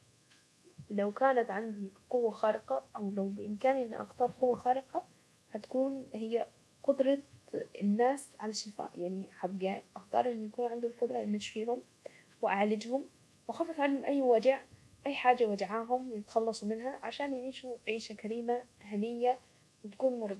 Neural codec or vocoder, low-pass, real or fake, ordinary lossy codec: codec, 24 kHz, 1.2 kbps, DualCodec; none; fake; none